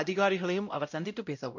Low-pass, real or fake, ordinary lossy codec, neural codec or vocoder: 7.2 kHz; fake; none; codec, 16 kHz, 0.5 kbps, X-Codec, WavLM features, trained on Multilingual LibriSpeech